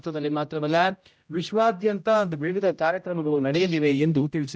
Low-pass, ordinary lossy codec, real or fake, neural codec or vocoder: none; none; fake; codec, 16 kHz, 0.5 kbps, X-Codec, HuBERT features, trained on general audio